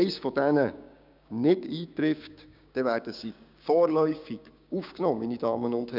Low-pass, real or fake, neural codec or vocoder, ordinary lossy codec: 5.4 kHz; fake; codec, 16 kHz, 6 kbps, DAC; none